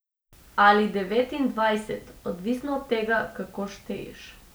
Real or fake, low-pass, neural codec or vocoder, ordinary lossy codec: real; none; none; none